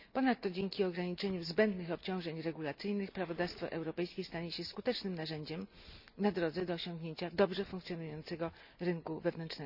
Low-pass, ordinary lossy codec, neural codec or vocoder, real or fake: 5.4 kHz; none; none; real